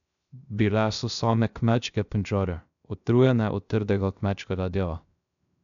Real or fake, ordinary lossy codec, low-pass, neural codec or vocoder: fake; MP3, 96 kbps; 7.2 kHz; codec, 16 kHz, 0.3 kbps, FocalCodec